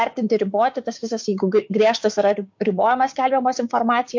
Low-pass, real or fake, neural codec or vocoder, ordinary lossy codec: 7.2 kHz; fake; codec, 16 kHz, 6 kbps, DAC; MP3, 48 kbps